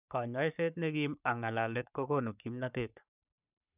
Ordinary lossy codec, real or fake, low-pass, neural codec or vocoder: none; fake; 3.6 kHz; codec, 44.1 kHz, 3.4 kbps, Pupu-Codec